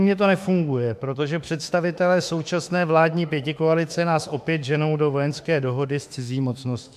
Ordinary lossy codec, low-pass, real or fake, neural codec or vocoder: MP3, 96 kbps; 14.4 kHz; fake; autoencoder, 48 kHz, 32 numbers a frame, DAC-VAE, trained on Japanese speech